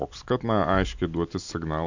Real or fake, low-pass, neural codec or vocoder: real; 7.2 kHz; none